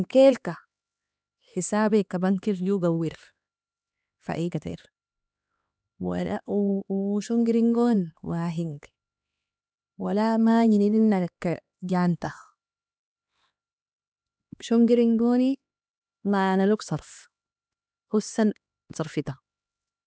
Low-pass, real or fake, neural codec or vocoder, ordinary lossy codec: none; fake; codec, 16 kHz, 2 kbps, X-Codec, HuBERT features, trained on LibriSpeech; none